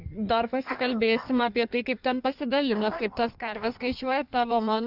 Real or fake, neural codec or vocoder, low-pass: fake; codec, 16 kHz in and 24 kHz out, 1.1 kbps, FireRedTTS-2 codec; 5.4 kHz